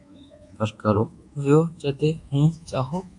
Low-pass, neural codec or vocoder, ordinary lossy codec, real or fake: 10.8 kHz; codec, 24 kHz, 1.2 kbps, DualCodec; AAC, 64 kbps; fake